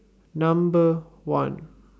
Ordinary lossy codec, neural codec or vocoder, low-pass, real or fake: none; none; none; real